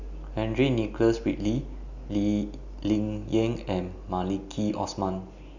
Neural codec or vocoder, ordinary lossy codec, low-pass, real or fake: none; none; 7.2 kHz; real